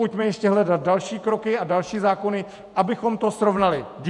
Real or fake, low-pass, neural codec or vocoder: fake; 10.8 kHz; vocoder, 48 kHz, 128 mel bands, Vocos